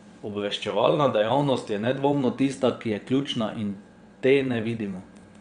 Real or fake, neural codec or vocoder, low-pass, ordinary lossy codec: fake; vocoder, 22.05 kHz, 80 mel bands, WaveNeXt; 9.9 kHz; Opus, 64 kbps